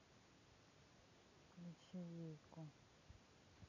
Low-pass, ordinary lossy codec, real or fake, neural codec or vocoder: 7.2 kHz; none; real; none